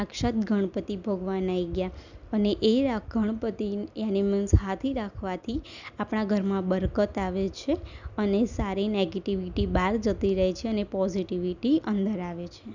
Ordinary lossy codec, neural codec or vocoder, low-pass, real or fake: none; none; 7.2 kHz; real